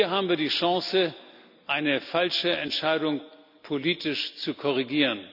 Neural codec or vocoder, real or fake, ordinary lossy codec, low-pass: none; real; none; 5.4 kHz